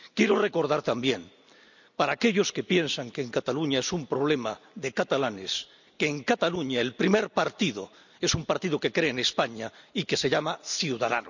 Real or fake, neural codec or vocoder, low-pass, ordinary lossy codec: real; none; 7.2 kHz; none